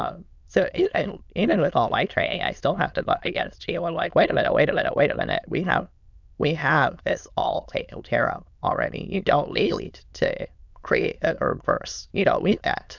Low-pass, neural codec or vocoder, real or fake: 7.2 kHz; autoencoder, 22.05 kHz, a latent of 192 numbers a frame, VITS, trained on many speakers; fake